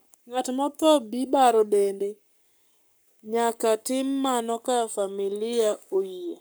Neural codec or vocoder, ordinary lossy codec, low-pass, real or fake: codec, 44.1 kHz, 7.8 kbps, Pupu-Codec; none; none; fake